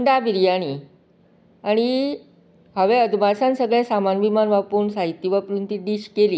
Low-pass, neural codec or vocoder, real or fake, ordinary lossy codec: none; none; real; none